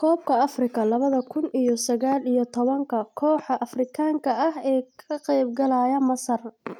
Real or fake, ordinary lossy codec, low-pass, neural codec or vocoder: real; none; 19.8 kHz; none